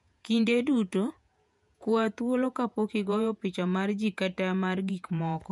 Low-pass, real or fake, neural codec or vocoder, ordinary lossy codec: 10.8 kHz; fake; vocoder, 48 kHz, 128 mel bands, Vocos; none